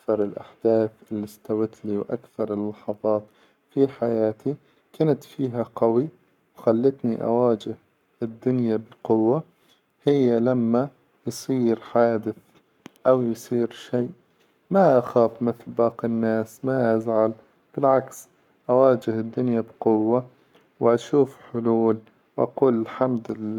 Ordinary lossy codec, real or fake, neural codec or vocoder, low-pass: none; fake; codec, 44.1 kHz, 7.8 kbps, Pupu-Codec; 19.8 kHz